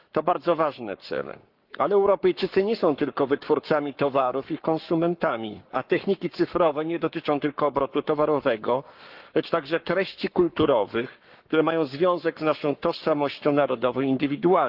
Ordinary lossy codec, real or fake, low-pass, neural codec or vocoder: Opus, 32 kbps; fake; 5.4 kHz; codec, 44.1 kHz, 7.8 kbps, Pupu-Codec